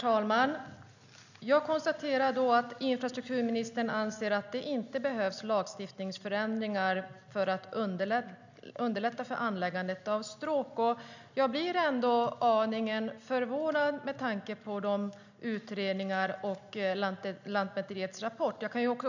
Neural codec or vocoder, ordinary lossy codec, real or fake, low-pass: none; none; real; 7.2 kHz